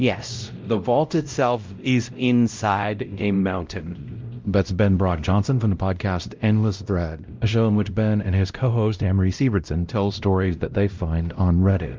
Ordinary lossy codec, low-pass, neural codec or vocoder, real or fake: Opus, 24 kbps; 7.2 kHz; codec, 16 kHz, 0.5 kbps, X-Codec, WavLM features, trained on Multilingual LibriSpeech; fake